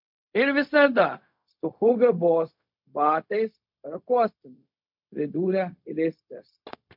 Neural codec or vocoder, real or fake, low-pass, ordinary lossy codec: codec, 16 kHz, 0.4 kbps, LongCat-Audio-Codec; fake; 5.4 kHz; MP3, 48 kbps